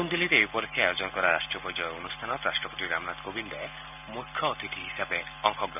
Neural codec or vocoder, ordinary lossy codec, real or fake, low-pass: none; none; real; 3.6 kHz